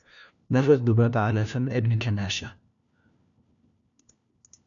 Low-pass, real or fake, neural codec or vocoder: 7.2 kHz; fake; codec, 16 kHz, 1 kbps, FunCodec, trained on LibriTTS, 50 frames a second